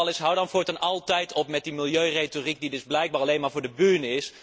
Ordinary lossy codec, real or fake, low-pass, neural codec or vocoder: none; real; none; none